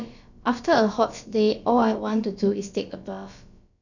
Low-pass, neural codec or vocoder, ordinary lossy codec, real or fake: 7.2 kHz; codec, 16 kHz, about 1 kbps, DyCAST, with the encoder's durations; none; fake